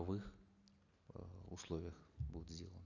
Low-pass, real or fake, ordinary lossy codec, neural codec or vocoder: 7.2 kHz; real; none; none